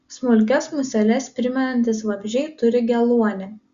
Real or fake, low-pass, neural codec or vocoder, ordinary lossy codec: real; 7.2 kHz; none; Opus, 64 kbps